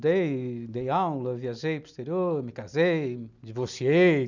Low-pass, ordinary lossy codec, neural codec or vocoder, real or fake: 7.2 kHz; none; none; real